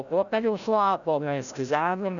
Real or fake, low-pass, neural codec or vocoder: fake; 7.2 kHz; codec, 16 kHz, 0.5 kbps, FreqCodec, larger model